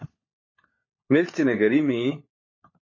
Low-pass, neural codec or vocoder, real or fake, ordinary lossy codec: 7.2 kHz; codec, 16 kHz, 4 kbps, FunCodec, trained on LibriTTS, 50 frames a second; fake; MP3, 32 kbps